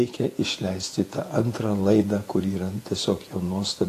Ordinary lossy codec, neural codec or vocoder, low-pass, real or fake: AAC, 48 kbps; vocoder, 48 kHz, 128 mel bands, Vocos; 14.4 kHz; fake